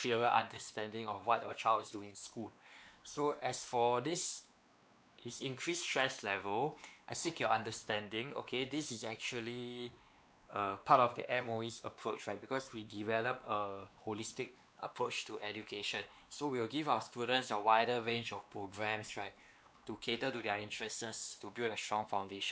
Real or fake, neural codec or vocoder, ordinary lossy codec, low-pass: fake; codec, 16 kHz, 2 kbps, X-Codec, WavLM features, trained on Multilingual LibriSpeech; none; none